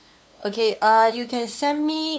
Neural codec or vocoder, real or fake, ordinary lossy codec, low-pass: codec, 16 kHz, 2 kbps, FunCodec, trained on LibriTTS, 25 frames a second; fake; none; none